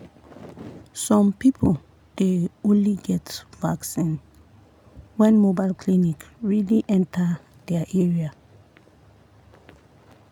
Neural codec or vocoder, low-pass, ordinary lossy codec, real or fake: none; 19.8 kHz; none; real